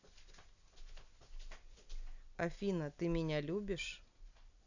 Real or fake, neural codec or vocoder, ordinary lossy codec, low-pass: real; none; none; 7.2 kHz